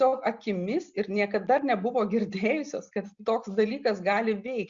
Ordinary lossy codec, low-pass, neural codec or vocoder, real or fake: Opus, 64 kbps; 7.2 kHz; none; real